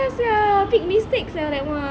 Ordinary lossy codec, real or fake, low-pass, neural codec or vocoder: none; real; none; none